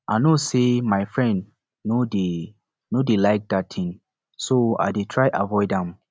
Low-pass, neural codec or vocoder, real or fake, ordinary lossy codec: none; none; real; none